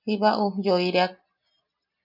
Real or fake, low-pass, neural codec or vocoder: real; 5.4 kHz; none